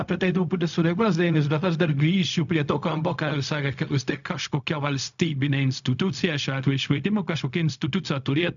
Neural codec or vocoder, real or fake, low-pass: codec, 16 kHz, 0.4 kbps, LongCat-Audio-Codec; fake; 7.2 kHz